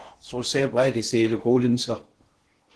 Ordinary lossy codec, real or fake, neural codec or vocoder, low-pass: Opus, 16 kbps; fake; codec, 16 kHz in and 24 kHz out, 0.6 kbps, FocalCodec, streaming, 2048 codes; 10.8 kHz